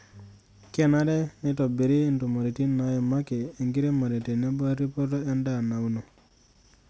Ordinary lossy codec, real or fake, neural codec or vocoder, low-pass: none; real; none; none